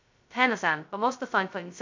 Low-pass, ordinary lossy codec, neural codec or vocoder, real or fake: 7.2 kHz; MP3, 64 kbps; codec, 16 kHz, 0.2 kbps, FocalCodec; fake